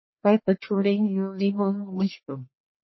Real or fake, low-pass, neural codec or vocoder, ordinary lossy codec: fake; 7.2 kHz; codec, 44.1 kHz, 1.7 kbps, Pupu-Codec; MP3, 24 kbps